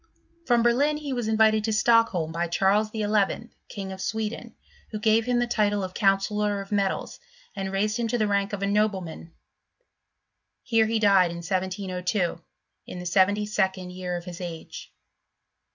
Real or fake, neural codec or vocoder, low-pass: real; none; 7.2 kHz